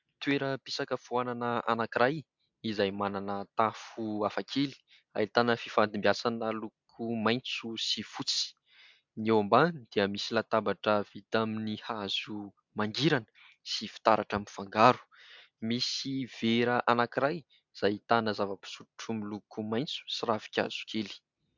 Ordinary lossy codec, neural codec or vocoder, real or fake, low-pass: MP3, 64 kbps; none; real; 7.2 kHz